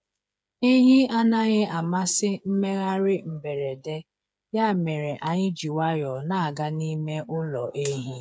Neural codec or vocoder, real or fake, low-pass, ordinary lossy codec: codec, 16 kHz, 8 kbps, FreqCodec, smaller model; fake; none; none